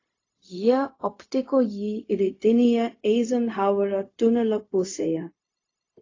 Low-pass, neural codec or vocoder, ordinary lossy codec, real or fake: 7.2 kHz; codec, 16 kHz, 0.4 kbps, LongCat-Audio-Codec; AAC, 32 kbps; fake